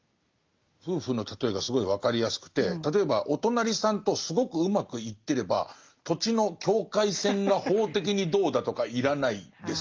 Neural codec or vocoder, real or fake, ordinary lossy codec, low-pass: none; real; Opus, 24 kbps; 7.2 kHz